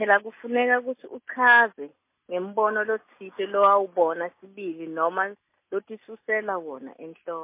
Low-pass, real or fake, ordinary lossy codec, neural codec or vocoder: 3.6 kHz; real; MP3, 24 kbps; none